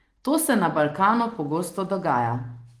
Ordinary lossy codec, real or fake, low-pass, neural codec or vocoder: Opus, 24 kbps; real; 14.4 kHz; none